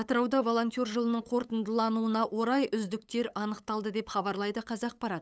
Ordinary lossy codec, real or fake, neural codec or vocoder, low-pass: none; fake; codec, 16 kHz, 8 kbps, FunCodec, trained on LibriTTS, 25 frames a second; none